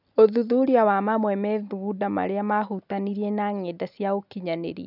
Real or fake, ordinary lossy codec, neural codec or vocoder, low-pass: real; none; none; 5.4 kHz